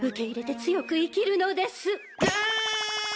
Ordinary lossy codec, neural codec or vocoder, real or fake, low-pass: none; none; real; none